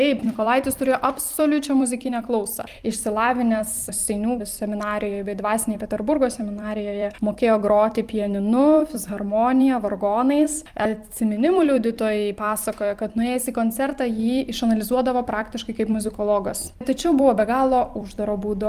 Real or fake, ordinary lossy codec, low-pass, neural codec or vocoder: fake; Opus, 32 kbps; 14.4 kHz; vocoder, 44.1 kHz, 128 mel bands every 256 samples, BigVGAN v2